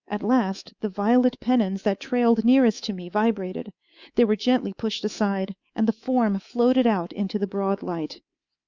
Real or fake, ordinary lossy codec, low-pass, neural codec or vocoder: fake; Opus, 64 kbps; 7.2 kHz; codec, 24 kHz, 3.1 kbps, DualCodec